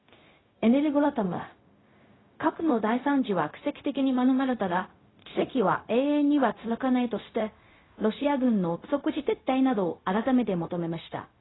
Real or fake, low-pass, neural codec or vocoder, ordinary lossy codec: fake; 7.2 kHz; codec, 16 kHz, 0.4 kbps, LongCat-Audio-Codec; AAC, 16 kbps